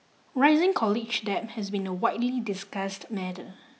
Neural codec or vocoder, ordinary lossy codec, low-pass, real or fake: none; none; none; real